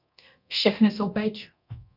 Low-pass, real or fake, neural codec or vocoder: 5.4 kHz; fake; codec, 24 kHz, 0.9 kbps, DualCodec